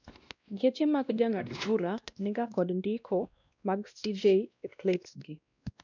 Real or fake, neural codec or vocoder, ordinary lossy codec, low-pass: fake; codec, 16 kHz, 1 kbps, X-Codec, WavLM features, trained on Multilingual LibriSpeech; none; 7.2 kHz